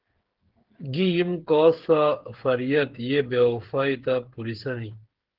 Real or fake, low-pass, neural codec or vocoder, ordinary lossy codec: fake; 5.4 kHz; codec, 16 kHz, 8 kbps, FreqCodec, smaller model; Opus, 16 kbps